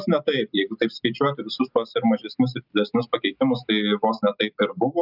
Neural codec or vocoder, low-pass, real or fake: none; 5.4 kHz; real